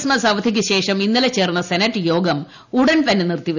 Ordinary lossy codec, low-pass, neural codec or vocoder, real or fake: none; 7.2 kHz; none; real